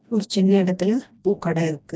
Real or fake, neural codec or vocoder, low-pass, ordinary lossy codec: fake; codec, 16 kHz, 1 kbps, FreqCodec, smaller model; none; none